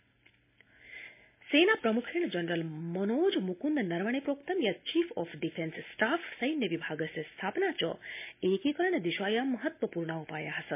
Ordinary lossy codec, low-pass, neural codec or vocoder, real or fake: MP3, 24 kbps; 3.6 kHz; none; real